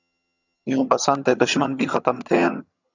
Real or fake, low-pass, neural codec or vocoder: fake; 7.2 kHz; vocoder, 22.05 kHz, 80 mel bands, HiFi-GAN